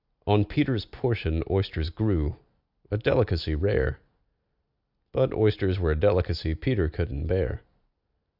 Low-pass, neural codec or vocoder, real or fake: 5.4 kHz; none; real